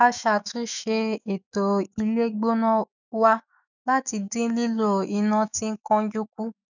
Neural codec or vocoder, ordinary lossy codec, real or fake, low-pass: autoencoder, 48 kHz, 128 numbers a frame, DAC-VAE, trained on Japanese speech; none; fake; 7.2 kHz